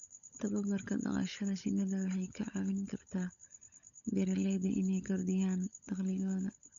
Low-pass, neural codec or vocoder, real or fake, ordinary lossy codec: 7.2 kHz; codec, 16 kHz, 16 kbps, FunCodec, trained on LibriTTS, 50 frames a second; fake; none